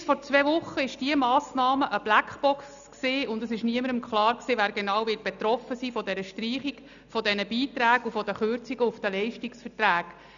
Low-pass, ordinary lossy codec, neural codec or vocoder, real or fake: 7.2 kHz; none; none; real